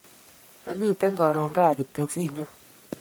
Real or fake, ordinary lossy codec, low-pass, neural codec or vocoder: fake; none; none; codec, 44.1 kHz, 1.7 kbps, Pupu-Codec